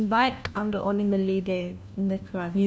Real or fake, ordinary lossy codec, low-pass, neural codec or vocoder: fake; none; none; codec, 16 kHz, 1 kbps, FunCodec, trained on LibriTTS, 50 frames a second